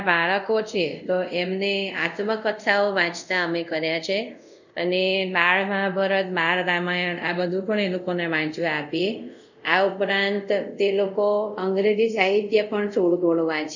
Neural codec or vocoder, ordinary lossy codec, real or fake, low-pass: codec, 24 kHz, 0.5 kbps, DualCodec; none; fake; 7.2 kHz